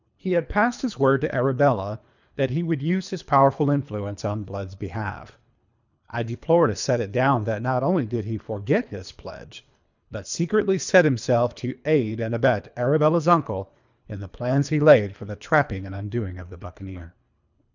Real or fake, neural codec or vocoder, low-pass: fake; codec, 24 kHz, 3 kbps, HILCodec; 7.2 kHz